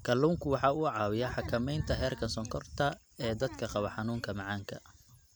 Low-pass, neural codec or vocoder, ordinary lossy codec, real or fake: none; none; none; real